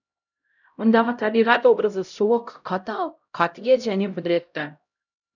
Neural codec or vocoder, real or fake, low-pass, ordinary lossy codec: codec, 16 kHz, 0.5 kbps, X-Codec, HuBERT features, trained on LibriSpeech; fake; 7.2 kHz; none